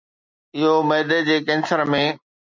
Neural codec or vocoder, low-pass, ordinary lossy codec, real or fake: none; 7.2 kHz; MP3, 64 kbps; real